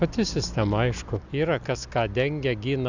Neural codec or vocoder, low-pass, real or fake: none; 7.2 kHz; real